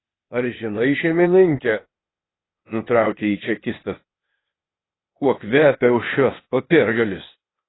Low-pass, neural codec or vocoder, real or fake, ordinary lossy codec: 7.2 kHz; codec, 16 kHz, 0.8 kbps, ZipCodec; fake; AAC, 16 kbps